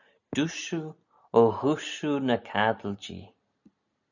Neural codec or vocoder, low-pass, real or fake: none; 7.2 kHz; real